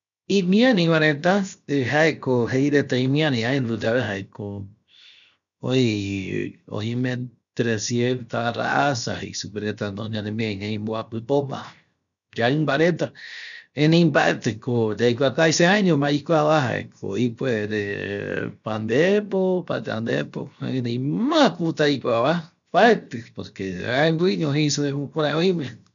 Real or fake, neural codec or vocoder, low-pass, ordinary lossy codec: fake; codec, 16 kHz, 0.7 kbps, FocalCodec; 7.2 kHz; none